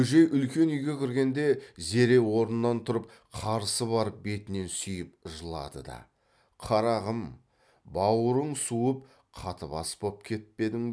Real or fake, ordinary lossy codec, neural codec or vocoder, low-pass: real; none; none; none